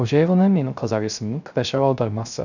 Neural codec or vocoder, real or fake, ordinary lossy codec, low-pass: codec, 16 kHz, 0.3 kbps, FocalCodec; fake; none; 7.2 kHz